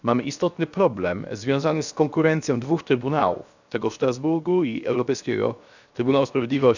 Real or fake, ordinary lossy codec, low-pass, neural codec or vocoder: fake; none; 7.2 kHz; codec, 16 kHz, 0.7 kbps, FocalCodec